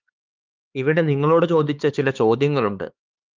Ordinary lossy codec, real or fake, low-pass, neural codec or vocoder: Opus, 24 kbps; fake; 7.2 kHz; codec, 16 kHz, 4 kbps, X-Codec, HuBERT features, trained on LibriSpeech